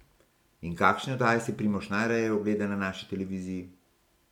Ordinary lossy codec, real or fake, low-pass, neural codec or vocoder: MP3, 96 kbps; real; 19.8 kHz; none